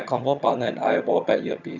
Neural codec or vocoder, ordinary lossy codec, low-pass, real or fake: vocoder, 22.05 kHz, 80 mel bands, HiFi-GAN; none; 7.2 kHz; fake